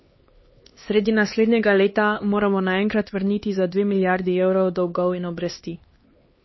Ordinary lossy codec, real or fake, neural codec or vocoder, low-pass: MP3, 24 kbps; fake; codec, 16 kHz, 2 kbps, X-Codec, HuBERT features, trained on LibriSpeech; 7.2 kHz